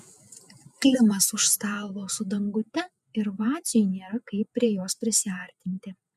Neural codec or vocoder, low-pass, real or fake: vocoder, 48 kHz, 128 mel bands, Vocos; 14.4 kHz; fake